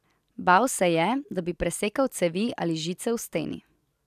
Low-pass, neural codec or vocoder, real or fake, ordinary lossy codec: 14.4 kHz; none; real; none